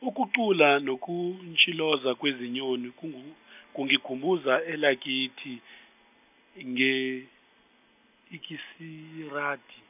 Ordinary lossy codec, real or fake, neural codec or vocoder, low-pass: none; real; none; 3.6 kHz